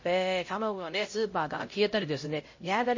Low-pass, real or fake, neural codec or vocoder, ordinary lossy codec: 7.2 kHz; fake; codec, 16 kHz, 0.5 kbps, X-Codec, HuBERT features, trained on LibriSpeech; MP3, 32 kbps